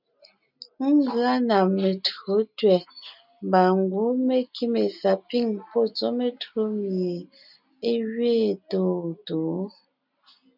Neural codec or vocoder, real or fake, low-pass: none; real; 5.4 kHz